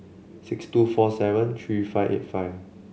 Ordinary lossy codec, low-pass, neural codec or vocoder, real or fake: none; none; none; real